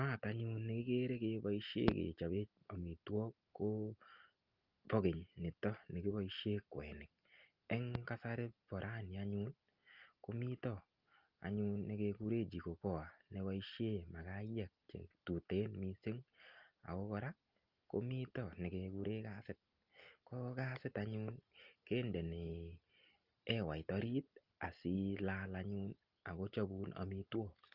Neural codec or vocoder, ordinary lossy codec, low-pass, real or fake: none; Opus, 24 kbps; 5.4 kHz; real